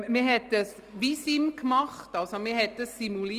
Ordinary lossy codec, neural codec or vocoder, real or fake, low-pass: Opus, 32 kbps; none; real; 14.4 kHz